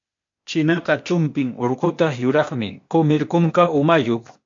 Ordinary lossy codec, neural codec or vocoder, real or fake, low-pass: MP3, 48 kbps; codec, 16 kHz, 0.8 kbps, ZipCodec; fake; 7.2 kHz